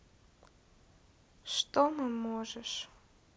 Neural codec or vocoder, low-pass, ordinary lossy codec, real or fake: none; none; none; real